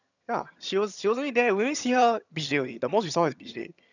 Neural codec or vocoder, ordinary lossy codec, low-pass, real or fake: vocoder, 22.05 kHz, 80 mel bands, HiFi-GAN; none; 7.2 kHz; fake